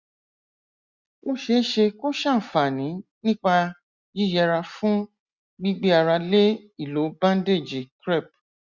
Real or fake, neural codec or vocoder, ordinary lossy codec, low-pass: real; none; Opus, 64 kbps; 7.2 kHz